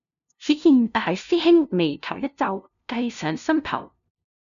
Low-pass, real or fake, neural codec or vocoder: 7.2 kHz; fake; codec, 16 kHz, 0.5 kbps, FunCodec, trained on LibriTTS, 25 frames a second